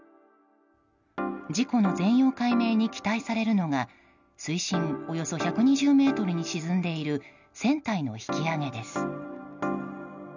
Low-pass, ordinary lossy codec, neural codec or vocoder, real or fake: 7.2 kHz; none; none; real